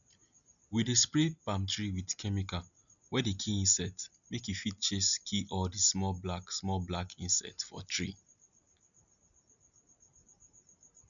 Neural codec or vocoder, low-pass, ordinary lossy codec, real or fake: none; 7.2 kHz; none; real